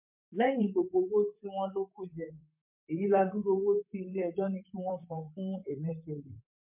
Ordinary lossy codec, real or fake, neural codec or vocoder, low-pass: MP3, 32 kbps; fake; vocoder, 44.1 kHz, 128 mel bands, Pupu-Vocoder; 3.6 kHz